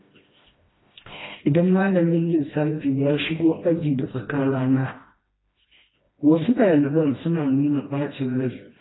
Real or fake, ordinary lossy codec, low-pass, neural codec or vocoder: fake; AAC, 16 kbps; 7.2 kHz; codec, 16 kHz, 1 kbps, FreqCodec, smaller model